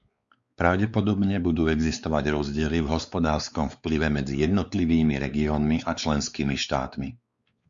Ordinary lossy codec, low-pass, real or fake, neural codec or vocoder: Opus, 64 kbps; 7.2 kHz; fake; codec, 16 kHz, 4 kbps, X-Codec, WavLM features, trained on Multilingual LibriSpeech